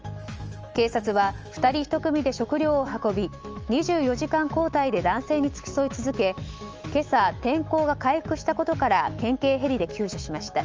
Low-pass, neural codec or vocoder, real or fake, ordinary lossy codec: 7.2 kHz; autoencoder, 48 kHz, 128 numbers a frame, DAC-VAE, trained on Japanese speech; fake; Opus, 24 kbps